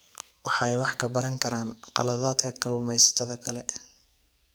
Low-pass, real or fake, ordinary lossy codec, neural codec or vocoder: none; fake; none; codec, 44.1 kHz, 2.6 kbps, SNAC